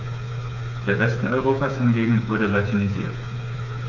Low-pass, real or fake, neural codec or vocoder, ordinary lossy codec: 7.2 kHz; fake; codec, 16 kHz, 4 kbps, FreqCodec, smaller model; none